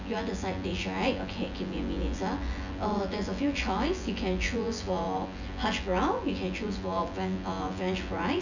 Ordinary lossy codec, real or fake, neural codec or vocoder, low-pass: none; fake; vocoder, 24 kHz, 100 mel bands, Vocos; 7.2 kHz